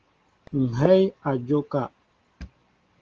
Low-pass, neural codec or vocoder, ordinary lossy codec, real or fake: 7.2 kHz; none; Opus, 16 kbps; real